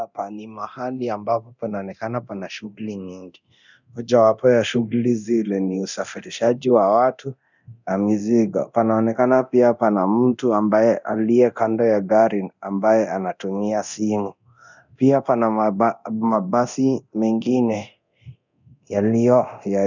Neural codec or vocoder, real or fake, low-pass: codec, 24 kHz, 0.9 kbps, DualCodec; fake; 7.2 kHz